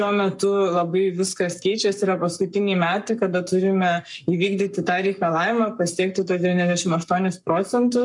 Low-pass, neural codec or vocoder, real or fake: 10.8 kHz; codec, 44.1 kHz, 7.8 kbps, Pupu-Codec; fake